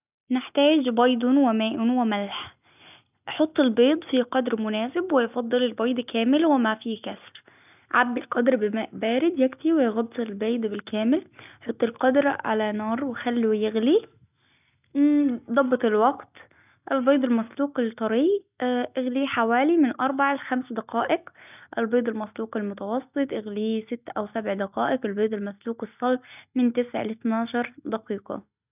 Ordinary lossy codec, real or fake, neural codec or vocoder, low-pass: none; real; none; 3.6 kHz